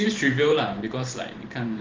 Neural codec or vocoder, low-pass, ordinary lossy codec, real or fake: vocoder, 44.1 kHz, 128 mel bands every 512 samples, BigVGAN v2; 7.2 kHz; Opus, 16 kbps; fake